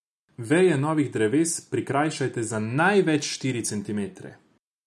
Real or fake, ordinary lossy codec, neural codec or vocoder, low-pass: real; none; none; none